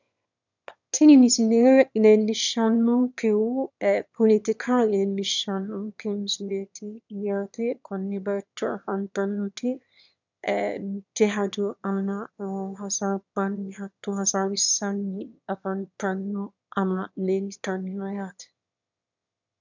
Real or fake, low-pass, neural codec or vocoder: fake; 7.2 kHz; autoencoder, 22.05 kHz, a latent of 192 numbers a frame, VITS, trained on one speaker